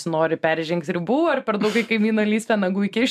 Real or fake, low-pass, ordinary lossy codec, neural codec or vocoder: real; 14.4 kHz; MP3, 96 kbps; none